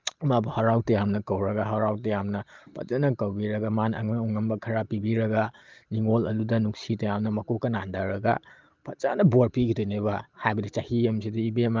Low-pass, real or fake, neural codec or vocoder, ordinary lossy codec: 7.2 kHz; real; none; Opus, 32 kbps